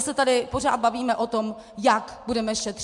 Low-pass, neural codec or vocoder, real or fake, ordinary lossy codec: 10.8 kHz; none; real; MP3, 64 kbps